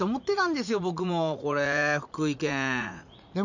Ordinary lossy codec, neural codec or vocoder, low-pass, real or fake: none; vocoder, 44.1 kHz, 80 mel bands, Vocos; 7.2 kHz; fake